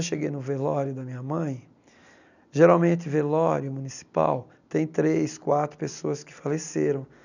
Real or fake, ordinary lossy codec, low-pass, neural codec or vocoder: real; none; 7.2 kHz; none